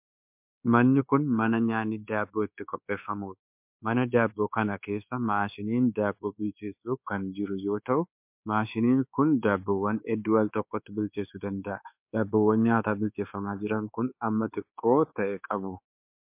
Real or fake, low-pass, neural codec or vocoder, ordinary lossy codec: fake; 3.6 kHz; codec, 24 kHz, 1.2 kbps, DualCodec; MP3, 32 kbps